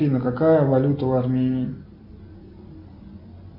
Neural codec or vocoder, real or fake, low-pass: none; real; 5.4 kHz